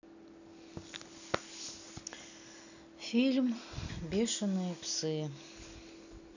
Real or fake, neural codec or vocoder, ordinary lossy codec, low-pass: real; none; none; 7.2 kHz